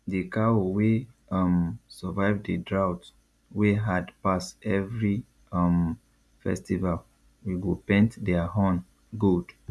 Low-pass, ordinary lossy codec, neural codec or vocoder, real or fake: none; none; none; real